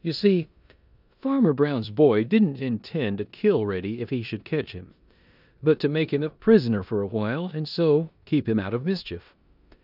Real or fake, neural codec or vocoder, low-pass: fake; codec, 16 kHz in and 24 kHz out, 0.9 kbps, LongCat-Audio-Codec, four codebook decoder; 5.4 kHz